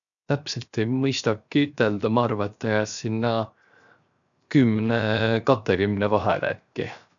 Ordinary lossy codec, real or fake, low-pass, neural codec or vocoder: none; fake; 7.2 kHz; codec, 16 kHz, 0.7 kbps, FocalCodec